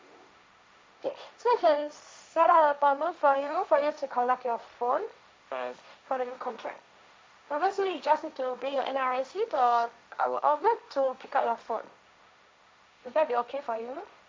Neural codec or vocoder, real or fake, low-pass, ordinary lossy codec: codec, 16 kHz, 1.1 kbps, Voila-Tokenizer; fake; none; none